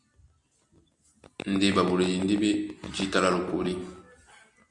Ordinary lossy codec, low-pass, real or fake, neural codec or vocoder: Opus, 64 kbps; 10.8 kHz; real; none